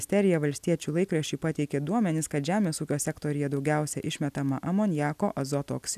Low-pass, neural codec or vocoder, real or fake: 14.4 kHz; none; real